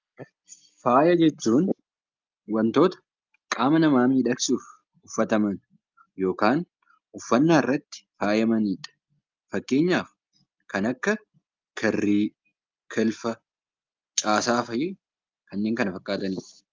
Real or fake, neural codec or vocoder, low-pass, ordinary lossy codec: real; none; 7.2 kHz; Opus, 24 kbps